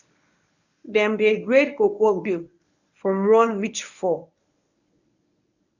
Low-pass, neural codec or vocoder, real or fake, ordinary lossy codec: 7.2 kHz; codec, 24 kHz, 0.9 kbps, WavTokenizer, medium speech release version 1; fake; none